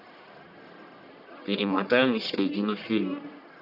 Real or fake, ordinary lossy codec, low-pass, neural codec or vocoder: fake; none; 5.4 kHz; codec, 44.1 kHz, 1.7 kbps, Pupu-Codec